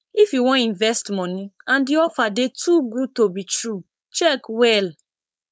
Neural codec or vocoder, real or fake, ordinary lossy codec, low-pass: codec, 16 kHz, 4.8 kbps, FACodec; fake; none; none